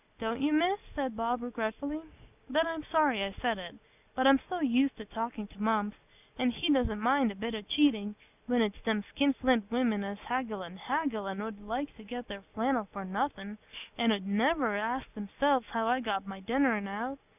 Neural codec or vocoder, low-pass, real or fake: none; 3.6 kHz; real